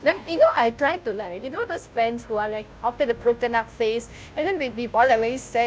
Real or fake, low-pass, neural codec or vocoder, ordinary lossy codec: fake; none; codec, 16 kHz, 0.5 kbps, FunCodec, trained on Chinese and English, 25 frames a second; none